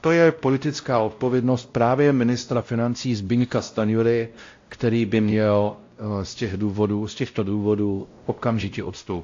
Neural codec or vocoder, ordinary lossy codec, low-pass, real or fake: codec, 16 kHz, 0.5 kbps, X-Codec, WavLM features, trained on Multilingual LibriSpeech; AAC, 48 kbps; 7.2 kHz; fake